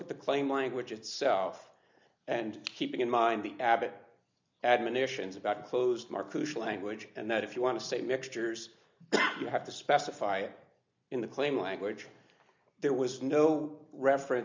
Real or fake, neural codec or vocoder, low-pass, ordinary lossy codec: real; none; 7.2 kHz; MP3, 64 kbps